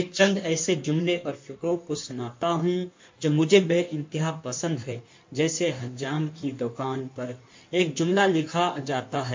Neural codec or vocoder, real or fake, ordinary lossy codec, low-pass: codec, 16 kHz in and 24 kHz out, 1.1 kbps, FireRedTTS-2 codec; fake; MP3, 48 kbps; 7.2 kHz